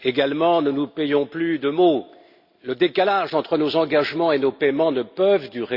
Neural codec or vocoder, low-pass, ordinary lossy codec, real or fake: none; 5.4 kHz; Opus, 64 kbps; real